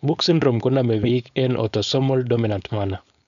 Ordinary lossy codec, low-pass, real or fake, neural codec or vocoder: none; 7.2 kHz; fake; codec, 16 kHz, 4.8 kbps, FACodec